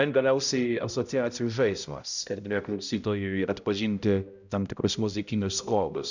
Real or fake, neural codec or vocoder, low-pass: fake; codec, 16 kHz, 0.5 kbps, X-Codec, HuBERT features, trained on balanced general audio; 7.2 kHz